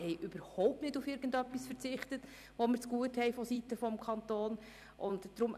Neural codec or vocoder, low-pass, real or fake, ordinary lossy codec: vocoder, 44.1 kHz, 128 mel bands every 256 samples, BigVGAN v2; 14.4 kHz; fake; none